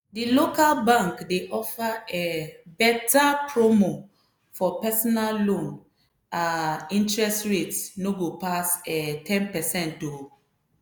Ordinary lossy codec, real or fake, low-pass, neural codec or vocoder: none; real; none; none